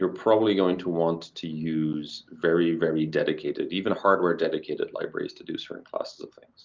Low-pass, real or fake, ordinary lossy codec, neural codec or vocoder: 7.2 kHz; real; Opus, 24 kbps; none